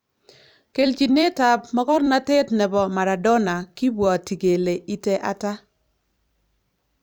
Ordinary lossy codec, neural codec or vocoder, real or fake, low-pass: none; vocoder, 44.1 kHz, 128 mel bands every 256 samples, BigVGAN v2; fake; none